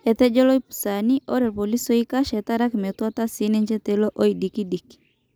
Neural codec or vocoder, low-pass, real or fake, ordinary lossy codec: none; none; real; none